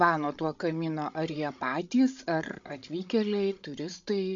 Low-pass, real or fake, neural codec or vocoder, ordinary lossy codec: 7.2 kHz; fake; codec, 16 kHz, 16 kbps, FreqCodec, larger model; MP3, 64 kbps